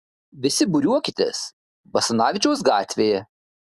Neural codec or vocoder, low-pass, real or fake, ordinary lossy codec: none; 14.4 kHz; real; Opus, 64 kbps